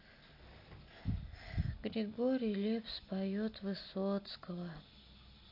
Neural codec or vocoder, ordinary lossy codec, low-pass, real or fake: none; AAC, 32 kbps; 5.4 kHz; real